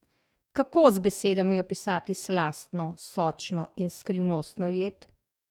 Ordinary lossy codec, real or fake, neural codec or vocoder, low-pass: none; fake; codec, 44.1 kHz, 2.6 kbps, DAC; 19.8 kHz